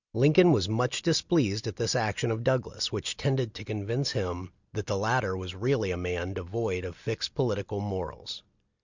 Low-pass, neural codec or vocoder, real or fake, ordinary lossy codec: 7.2 kHz; none; real; Opus, 64 kbps